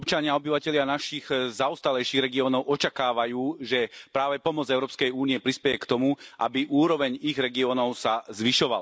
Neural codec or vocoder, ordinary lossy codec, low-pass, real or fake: none; none; none; real